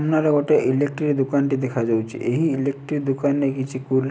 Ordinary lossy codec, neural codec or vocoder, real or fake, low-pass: none; none; real; none